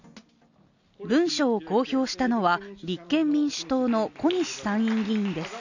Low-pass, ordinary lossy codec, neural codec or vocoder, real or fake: 7.2 kHz; none; none; real